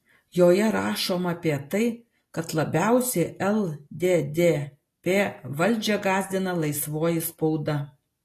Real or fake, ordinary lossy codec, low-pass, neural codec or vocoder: real; AAC, 48 kbps; 14.4 kHz; none